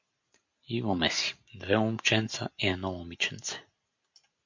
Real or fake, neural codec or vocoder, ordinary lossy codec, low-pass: real; none; MP3, 48 kbps; 7.2 kHz